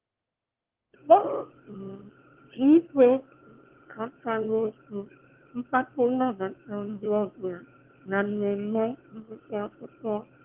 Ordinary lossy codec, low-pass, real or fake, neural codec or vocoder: Opus, 16 kbps; 3.6 kHz; fake; autoencoder, 22.05 kHz, a latent of 192 numbers a frame, VITS, trained on one speaker